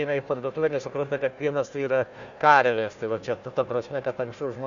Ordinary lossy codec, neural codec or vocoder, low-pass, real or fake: Opus, 64 kbps; codec, 16 kHz, 1 kbps, FunCodec, trained on Chinese and English, 50 frames a second; 7.2 kHz; fake